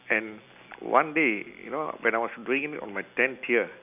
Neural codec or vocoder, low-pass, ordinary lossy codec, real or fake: none; 3.6 kHz; none; real